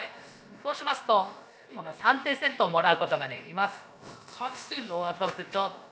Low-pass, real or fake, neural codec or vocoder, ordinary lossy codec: none; fake; codec, 16 kHz, about 1 kbps, DyCAST, with the encoder's durations; none